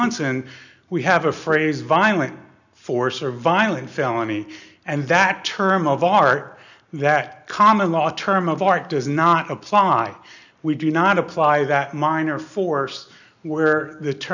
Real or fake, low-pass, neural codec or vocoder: real; 7.2 kHz; none